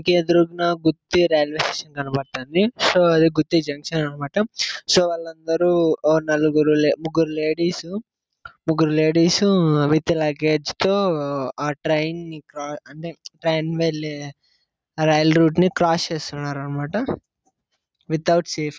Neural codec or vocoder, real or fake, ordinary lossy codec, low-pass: none; real; none; none